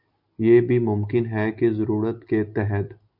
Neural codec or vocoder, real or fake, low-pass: none; real; 5.4 kHz